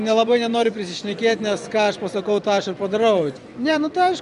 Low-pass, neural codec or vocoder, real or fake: 10.8 kHz; vocoder, 24 kHz, 100 mel bands, Vocos; fake